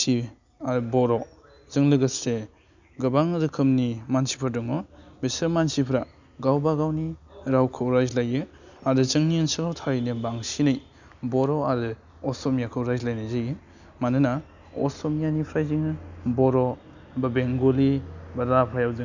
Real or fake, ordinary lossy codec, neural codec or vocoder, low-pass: real; none; none; 7.2 kHz